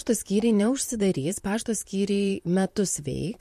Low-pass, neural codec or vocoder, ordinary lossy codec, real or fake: 14.4 kHz; vocoder, 44.1 kHz, 128 mel bands, Pupu-Vocoder; MP3, 64 kbps; fake